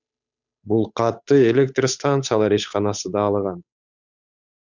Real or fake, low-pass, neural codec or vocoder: fake; 7.2 kHz; codec, 16 kHz, 8 kbps, FunCodec, trained on Chinese and English, 25 frames a second